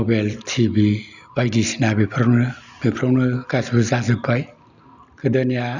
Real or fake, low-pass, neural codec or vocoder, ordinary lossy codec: real; 7.2 kHz; none; none